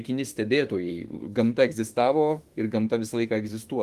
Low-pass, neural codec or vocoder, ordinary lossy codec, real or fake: 14.4 kHz; autoencoder, 48 kHz, 32 numbers a frame, DAC-VAE, trained on Japanese speech; Opus, 24 kbps; fake